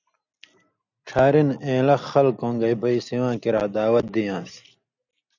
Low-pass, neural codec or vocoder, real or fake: 7.2 kHz; none; real